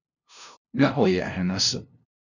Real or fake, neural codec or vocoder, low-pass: fake; codec, 16 kHz, 0.5 kbps, FunCodec, trained on LibriTTS, 25 frames a second; 7.2 kHz